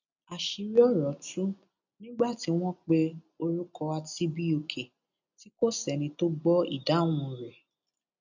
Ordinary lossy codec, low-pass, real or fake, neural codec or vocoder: none; 7.2 kHz; real; none